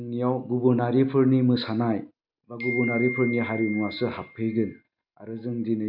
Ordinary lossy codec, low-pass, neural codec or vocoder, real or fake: none; 5.4 kHz; none; real